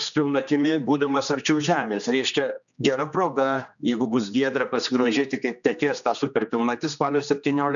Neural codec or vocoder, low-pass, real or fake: codec, 16 kHz, 2 kbps, X-Codec, HuBERT features, trained on general audio; 7.2 kHz; fake